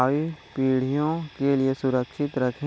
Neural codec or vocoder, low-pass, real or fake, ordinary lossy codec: none; none; real; none